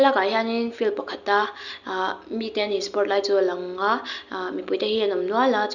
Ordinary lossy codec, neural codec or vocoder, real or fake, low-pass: none; none; real; 7.2 kHz